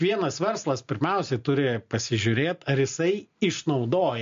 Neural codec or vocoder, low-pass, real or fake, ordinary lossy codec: none; 7.2 kHz; real; MP3, 48 kbps